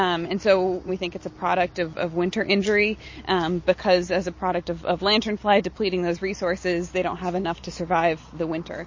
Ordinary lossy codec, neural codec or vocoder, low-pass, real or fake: MP3, 32 kbps; none; 7.2 kHz; real